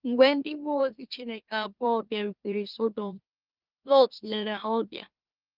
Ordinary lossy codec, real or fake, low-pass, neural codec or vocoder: Opus, 32 kbps; fake; 5.4 kHz; autoencoder, 44.1 kHz, a latent of 192 numbers a frame, MeloTTS